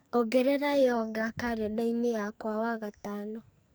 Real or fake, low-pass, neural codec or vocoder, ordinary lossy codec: fake; none; codec, 44.1 kHz, 2.6 kbps, SNAC; none